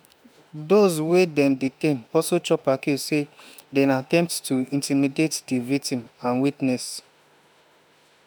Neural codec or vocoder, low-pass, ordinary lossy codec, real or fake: autoencoder, 48 kHz, 32 numbers a frame, DAC-VAE, trained on Japanese speech; none; none; fake